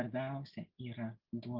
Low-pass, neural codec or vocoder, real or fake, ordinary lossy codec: 5.4 kHz; none; real; Opus, 32 kbps